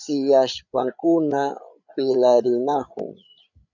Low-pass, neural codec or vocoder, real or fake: 7.2 kHz; codec, 16 kHz, 8 kbps, FreqCodec, larger model; fake